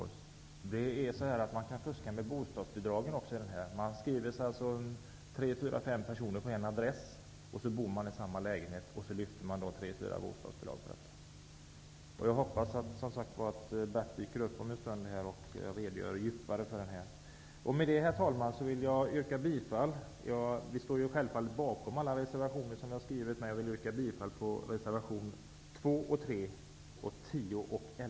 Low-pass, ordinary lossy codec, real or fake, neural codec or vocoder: none; none; real; none